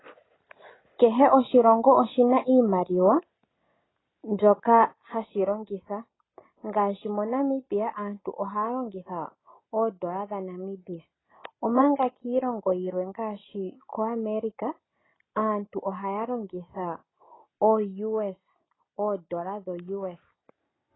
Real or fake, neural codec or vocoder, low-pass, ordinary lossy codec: real; none; 7.2 kHz; AAC, 16 kbps